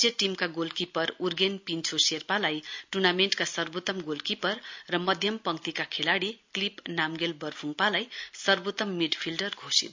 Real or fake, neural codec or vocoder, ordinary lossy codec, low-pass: real; none; MP3, 64 kbps; 7.2 kHz